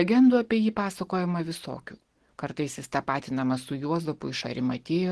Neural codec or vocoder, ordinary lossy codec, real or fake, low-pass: none; Opus, 16 kbps; real; 10.8 kHz